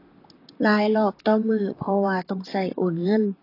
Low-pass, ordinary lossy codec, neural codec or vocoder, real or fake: 5.4 kHz; AAC, 24 kbps; vocoder, 44.1 kHz, 128 mel bands, Pupu-Vocoder; fake